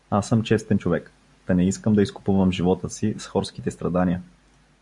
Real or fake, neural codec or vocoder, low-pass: real; none; 10.8 kHz